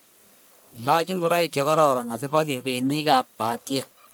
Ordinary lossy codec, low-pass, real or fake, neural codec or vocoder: none; none; fake; codec, 44.1 kHz, 1.7 kbps, Pupu-Codec